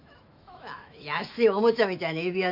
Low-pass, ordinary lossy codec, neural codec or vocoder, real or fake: 5.4 kHz; MP3, 48 kbps; autoencoder, 48 kHz, 128 numbers a frame, DAC-VAE, trained on Japanese speech; fake